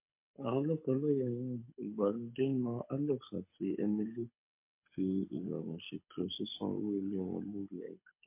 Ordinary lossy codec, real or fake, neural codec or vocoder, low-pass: MP3, 24 kbps; fake; codec, 24 kHz, 6 kbps, HILCodec; 3.6 kHz